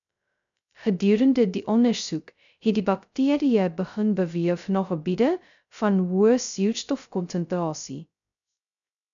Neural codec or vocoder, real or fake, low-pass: codec, 16 kHz, 0.2 kbps, FocalCodec; fake; 7.2 kHz